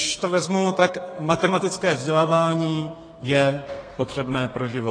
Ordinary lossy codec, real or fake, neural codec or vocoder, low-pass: AAC, 32 kbps; fake; codec, 32 kHz, 1.9 kbps, SNAC; 9.9 kHz